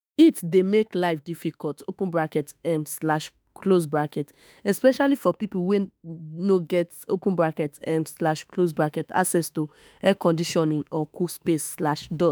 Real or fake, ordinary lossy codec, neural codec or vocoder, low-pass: fake; none; autoencoder, 48 kHz, 32 numbers a frame, DAC-VAE, trained on Japanese speech; none